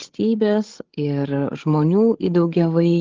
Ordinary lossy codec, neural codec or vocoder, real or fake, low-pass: Opus, 16 kbps; codec, 16 kHz, 8 kbps, FreqCodec, larger model; fake; 7.2 kHz